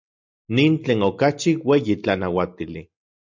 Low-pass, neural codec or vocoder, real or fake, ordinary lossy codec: 7.2 kHz; none; real; MP3, 64 kbps